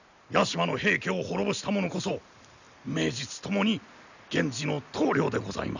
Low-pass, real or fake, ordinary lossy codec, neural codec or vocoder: 7.2 kHz; real; none; none